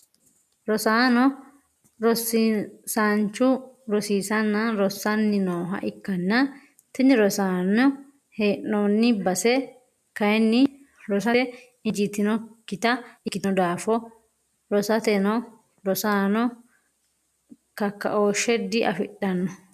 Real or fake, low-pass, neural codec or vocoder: real; 14.4 kHz; none